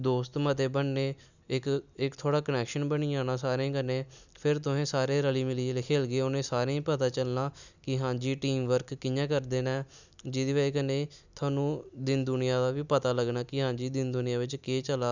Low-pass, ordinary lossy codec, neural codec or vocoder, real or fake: 7.2 kHz; none; none; real